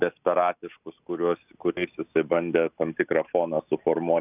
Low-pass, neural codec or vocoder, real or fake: 3.6 kHz; none; real